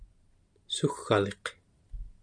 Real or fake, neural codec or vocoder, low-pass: real; none; 9.9 kHz